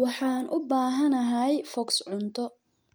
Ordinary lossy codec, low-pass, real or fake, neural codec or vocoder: none; none; real; none